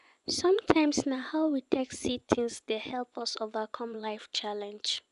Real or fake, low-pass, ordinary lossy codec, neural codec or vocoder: fake; 10.8 kHz; none; vocoder, 24 kHz, 100 mel bands, Vocos